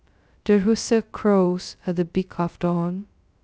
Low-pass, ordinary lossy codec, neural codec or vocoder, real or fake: none; none; codec, 16 kHz, 0.2 kbps, FocalCodec; fake